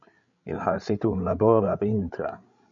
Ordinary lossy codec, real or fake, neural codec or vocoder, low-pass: MP3, 96 kbps; fake; codec, 16 kHz, 8 kbps, FreqCodec, larger model; 7.2 kHz